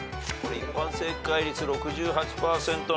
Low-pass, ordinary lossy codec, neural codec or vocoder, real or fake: none; none; none; real